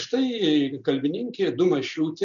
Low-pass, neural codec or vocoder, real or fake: 7.2 kHz; none; real